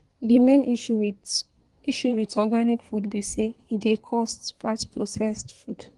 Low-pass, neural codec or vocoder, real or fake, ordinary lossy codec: 10.8 kHz; codec, 24 kHz, 1 kbps, SNAC; fake; Opus, 24 kbps